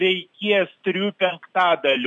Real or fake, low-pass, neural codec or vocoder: real; 10.8 kHz; none